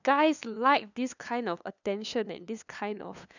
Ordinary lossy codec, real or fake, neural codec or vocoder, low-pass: none; fake; codec, 16 kHz, 2 kbps, FunCodec, trained on LibriTTS, 25 frames a second; 7.2 kHz